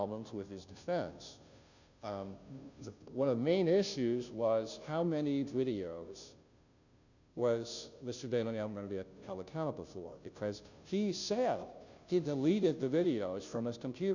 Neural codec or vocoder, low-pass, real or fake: codec, 16 kHz, 0.5 kbps, FunCodec, trained on Chinese and English, 25 frames a second; 7.2 kHz; fake